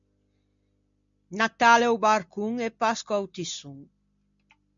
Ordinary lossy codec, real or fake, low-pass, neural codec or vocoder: MP3, 64 kbps; real; 7.2 kHz; none